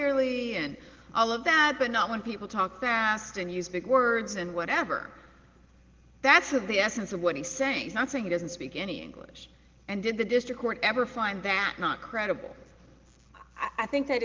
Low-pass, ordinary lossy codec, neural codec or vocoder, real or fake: 7.2 kHz; Opus, 16 kbps; none; real